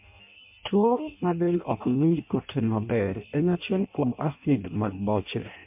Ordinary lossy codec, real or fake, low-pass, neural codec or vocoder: MP3, 32 kbps; fake; 3.6 kHz; codec, 16 kHz in and 24 kHz out, 0.6 kbps, FireRedTTS-2 codec